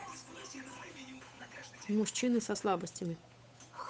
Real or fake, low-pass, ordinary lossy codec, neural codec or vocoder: fake; none; none; codec, 16 kHz, 8 kbps, FunCodec, trained on Chinese and English, 25 frames a second